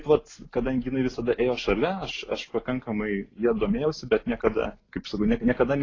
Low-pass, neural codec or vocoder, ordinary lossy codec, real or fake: 7.2 kHz; none; AAC, 32 kbps; real